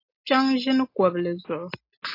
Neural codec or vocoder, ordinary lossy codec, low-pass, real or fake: none; AAC, 32 kbps; 5.4 kHz; real